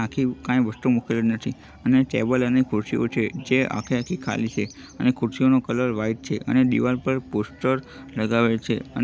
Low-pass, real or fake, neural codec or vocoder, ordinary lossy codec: none; real; none; none